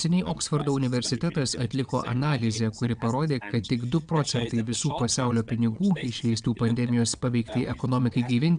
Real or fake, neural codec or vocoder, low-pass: fake; vocoder, 22.05 kHz, 80 mel bands, WaveNeXt; 9.9 kHz